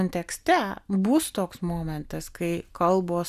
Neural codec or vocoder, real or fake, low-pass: none; real; 14.4 kHz